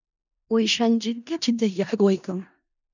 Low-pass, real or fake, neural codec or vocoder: 7.2 kHz; fake; codec, 16 kHz in and 24 kHz out, 0.4 kbps, LongCat-Audio-Codec, four codebook decoder